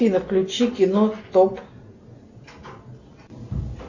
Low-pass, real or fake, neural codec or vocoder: 7.2 kHz; real; none